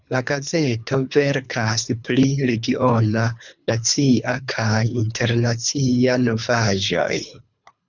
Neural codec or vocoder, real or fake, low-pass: codec, 24 kHz, 3 kbps, HILCodec; fake; 7.2 kHz